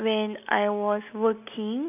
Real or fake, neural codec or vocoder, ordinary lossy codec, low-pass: real; none; none; 3.6 kHz